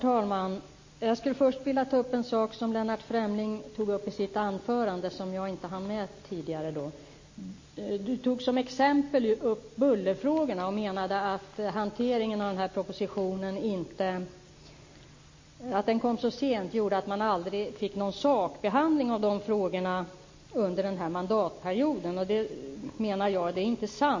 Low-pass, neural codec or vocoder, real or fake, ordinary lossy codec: 7.2 kHz; none; real; MP3, 32 kbps